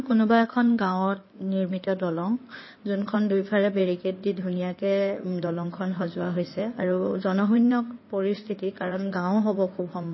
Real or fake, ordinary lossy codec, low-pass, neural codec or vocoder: fake; MP3, 24 kbps; 7.2 kHz; codec, 16 kHz in and 24 kHz out, 2.2 kbps, FireRedTTS-2 codec